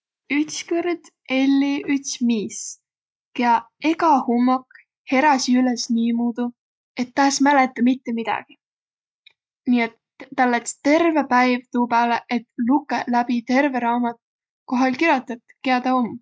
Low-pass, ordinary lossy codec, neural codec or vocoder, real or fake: none; none; none; real